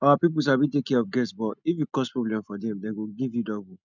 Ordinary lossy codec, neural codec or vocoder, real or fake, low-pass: none; none; real; 7.2 kHz